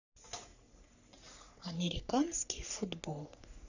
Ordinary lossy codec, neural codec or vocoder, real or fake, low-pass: none; codec, 44.1 kHz, 3.4 kbps, Pupu-Codec; fake; 7.2 kHz